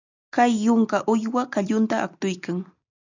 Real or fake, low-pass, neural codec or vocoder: real; 7.2 kHz; none